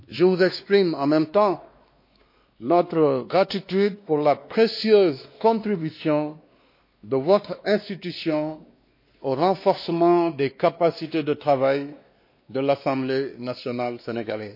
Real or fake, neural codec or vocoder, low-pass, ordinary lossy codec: fake; codec, 16 kHz, 2 kbps, X-Codec, WavLM features, trained on Multilingual LibriSpeech; 5.4 kHz; MP3, 32 kbps